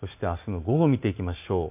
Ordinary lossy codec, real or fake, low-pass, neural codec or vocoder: none; real; 3.6 kHz; none